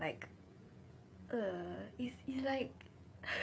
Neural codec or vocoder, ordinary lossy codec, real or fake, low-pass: codec, 16 kHz, 16 kbps, FreqCodec, smaller model; none; fake; none